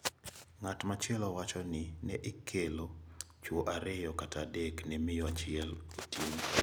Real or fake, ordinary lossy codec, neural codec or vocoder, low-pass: real; none; none; none